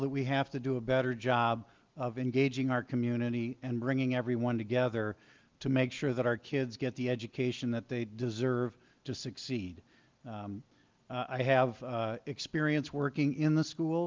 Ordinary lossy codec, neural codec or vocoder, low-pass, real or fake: Opus, 32 kbps; none; 7.2 kHz; real